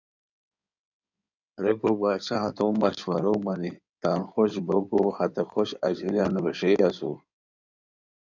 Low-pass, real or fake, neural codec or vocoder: 7.2 kHz; fake; codec, 16 kHz in and 24 kHz out, 2.2 kbps, FireRedTTS-2 codec